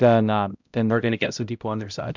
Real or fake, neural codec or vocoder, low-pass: fake; codec, 16 kHz, 0.5 kbps, X-Codec, HuBERT features, trained on balanced general audio; 7.2 kHz